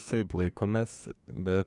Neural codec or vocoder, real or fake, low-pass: codec, 24 kHz, 1 kbps, SNAC; fake; 10.8 kHz